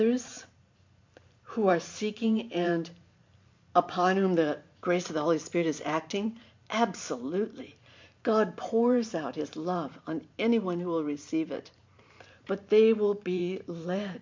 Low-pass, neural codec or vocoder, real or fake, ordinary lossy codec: 7.2 kHz; vocoder, 44.1 kHz, 128 mel bands every 512 samples, BigVGAN v2; fake; MP3, 48 kbps